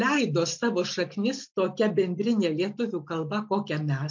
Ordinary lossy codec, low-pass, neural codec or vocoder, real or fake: MP3, 48 kbps; 7.2 kHz; none; real